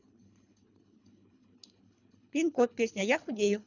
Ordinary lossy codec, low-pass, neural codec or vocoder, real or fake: none; 7.2 kHz; codec, 24 kHz, 3 kbps, HILCodec; fake